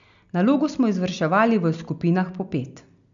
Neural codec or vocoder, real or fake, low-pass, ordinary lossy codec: none; real; 7.2 kHz; MP3, 96 kbps